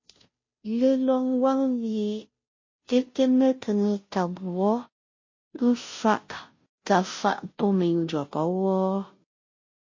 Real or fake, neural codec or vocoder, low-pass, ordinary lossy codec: fake; codec, 16 kHz, 0.5 kbps, FunCodec, trained on Chinese and English, 25 frames a second; 7.2 kHz; MP3, 32 kbps